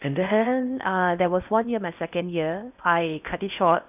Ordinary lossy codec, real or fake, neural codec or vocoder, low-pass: none; fake; codec, 16 kHz in and 24 kHz out, 0.6 kbps, FocalCodec, streaming, 4096 codes; 3.6 kHz